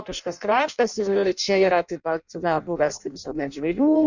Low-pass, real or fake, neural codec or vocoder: 7.2 kHz; fake; codec, 16 kHz in and 24 kHz out, 0.6 kbps, FireRedTTS-2 codec